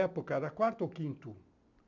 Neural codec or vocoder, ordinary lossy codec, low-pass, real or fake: none; none; 7.2 kHz; real